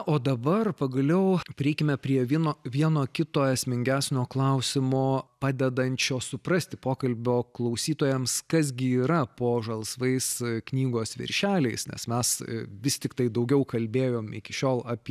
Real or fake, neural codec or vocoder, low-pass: real; none; 14.4 kHz